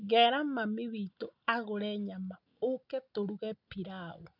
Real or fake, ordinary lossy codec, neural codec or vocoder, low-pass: real; none; none; 5.4 kHz